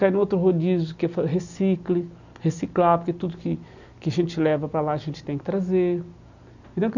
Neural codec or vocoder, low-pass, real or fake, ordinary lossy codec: none; 7.2 kHz; real; none